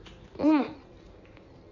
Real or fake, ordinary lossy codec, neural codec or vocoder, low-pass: fake; none; codec, 16 kHz in and 24 kHz out, 1.1 kbps, FireRedTTS-2 codec; 7.2 kHz